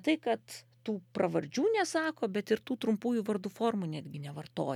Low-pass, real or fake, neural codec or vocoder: 19.8 kHz; real; none